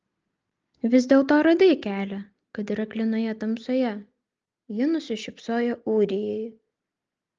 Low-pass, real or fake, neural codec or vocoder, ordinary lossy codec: 7.2 kHz; real; none; Opus, 24 kbps